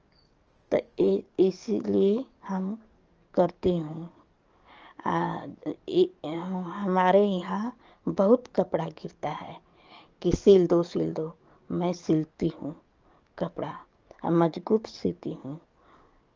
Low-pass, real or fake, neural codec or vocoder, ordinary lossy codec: 7.2 kHz; fake; codec, 44.1 kHz, 7.8 kbps, DAC; Opus, 24 kbps